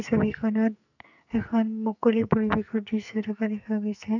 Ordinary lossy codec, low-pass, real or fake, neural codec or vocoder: none; 7.2 kHz; fake; codec, 44.1 kHz, 2.6 kbps, SNAC